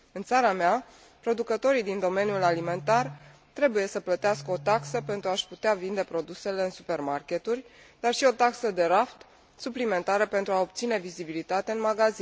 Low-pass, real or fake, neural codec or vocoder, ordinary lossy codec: none; real; none; none